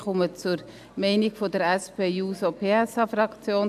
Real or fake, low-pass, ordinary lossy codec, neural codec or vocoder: real; 14.4 kHz; AAC, 96 kbps; none